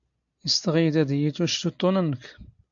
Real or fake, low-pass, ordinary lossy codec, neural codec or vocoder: real; 7.2 kHz; AAC, 64 kbps; none